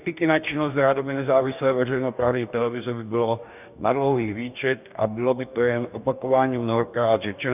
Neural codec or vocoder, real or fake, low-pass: codec, 44.1 kHz, 2.6 kbps, DAC; fake; 3.6 kHz